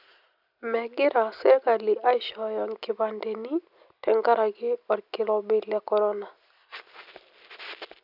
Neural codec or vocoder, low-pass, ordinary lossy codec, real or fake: none; 5.4 kHz; none; real